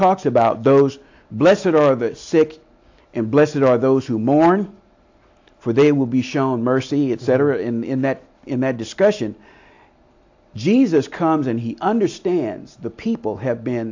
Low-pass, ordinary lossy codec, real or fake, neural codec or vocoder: 7.2 kHz; AAC, 48 kbps; real; none